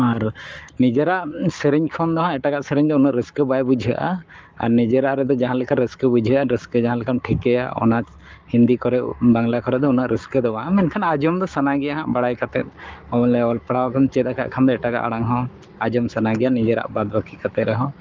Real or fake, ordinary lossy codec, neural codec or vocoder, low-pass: fake; Opus, 24 kbps; codec, 44.1 kHz, 7.8 kbps, Pupu-Codec; 7.2 kHz